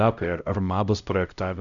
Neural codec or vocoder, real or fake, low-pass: codec, 16 kHz, 0.5 kbps, X-Codec, HuBERT features, trained on LibriSpeech; fake; 7.2 kHz